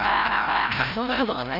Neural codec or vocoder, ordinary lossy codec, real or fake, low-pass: codec, 16 kHz, 0.5 kbps, FreqCodec, larger model; none; fake; 5.4 kHz